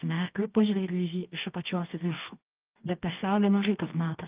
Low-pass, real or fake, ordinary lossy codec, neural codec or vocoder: 3.6 kHz; fake; Opus, 32 kbps; codec, 24 kHz, 0.9 kbps, WavTokenizer, medium music audio release